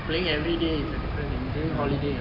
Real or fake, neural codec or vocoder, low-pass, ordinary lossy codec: real; none; 5.4 kHz; none